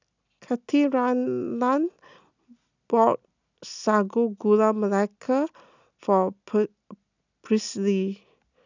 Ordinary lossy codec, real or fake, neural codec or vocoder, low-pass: none; real; none; 7.2 kHz